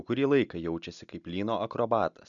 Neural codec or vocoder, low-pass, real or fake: none; 7.2 kHz; real